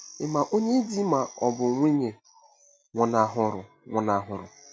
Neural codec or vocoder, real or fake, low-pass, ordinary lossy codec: none; real; none; none